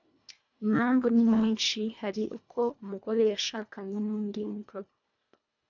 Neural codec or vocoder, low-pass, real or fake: codec, 24 kHz, 1.5 kbps, HILCodec; 7.2 kHz; fake